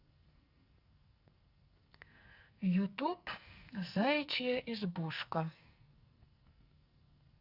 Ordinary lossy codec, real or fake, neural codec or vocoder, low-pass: Opus, 64 kbps; fake; codec, 44.1 kHz, 2.6 kbps, SNAC; 5.4 kHz